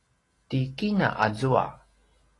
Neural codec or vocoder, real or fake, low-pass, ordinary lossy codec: none; real; 10.8 kHz; AAC, 32 kbps